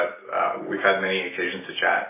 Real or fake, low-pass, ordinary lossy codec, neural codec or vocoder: real; 3.6 kHz; MP3, 16 kbps; none